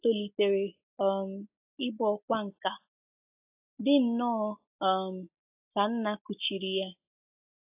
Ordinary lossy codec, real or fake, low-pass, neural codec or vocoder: AAC, 32 kbps; real; 3.6 kHz; none